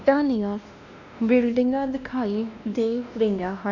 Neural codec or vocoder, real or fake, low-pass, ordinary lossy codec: codec, 16 kHz, 1 kbps, X-Codec, WavLM features, trained on Multilingual LibriSpeech; fake; 7.2 kHz; none